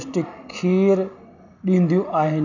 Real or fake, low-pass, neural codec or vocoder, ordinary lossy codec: real; 7.2 kHz; none; none